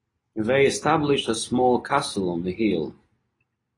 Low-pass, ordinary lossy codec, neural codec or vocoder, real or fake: 10.8 kHz; AAC, 32 kbps; none; real